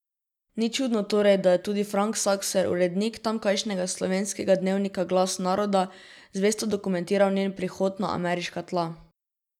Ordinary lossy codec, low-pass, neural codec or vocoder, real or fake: none; 19.8 kHz; none; real